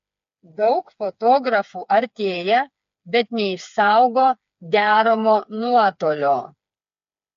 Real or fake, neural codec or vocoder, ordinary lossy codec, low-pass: fake; codec, 16 kHz, 4 kbps, FreqCodec, smaller model; MP3, 48 kbps; 7.2 kHz